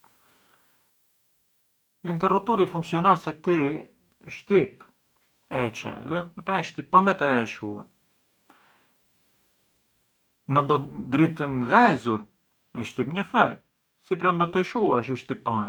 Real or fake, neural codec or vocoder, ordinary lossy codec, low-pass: fake; codec, 44.1 kHz, 2.6 kbps, DAC; none; none